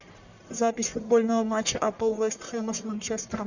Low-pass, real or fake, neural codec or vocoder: 7.2 kHz; fake; codec, 44.1 kHz, 1.7 kbps, Pupu-Codec